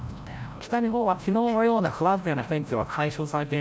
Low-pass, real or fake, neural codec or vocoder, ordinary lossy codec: none; fake; codec, 16 kHz, 0.5 kbps, FreqCodec, larger model; none